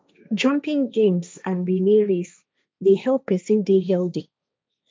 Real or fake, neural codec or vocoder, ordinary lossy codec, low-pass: fake; codec, 16 kHz, 1.1 kbps, Voila-Tokenizer; none; none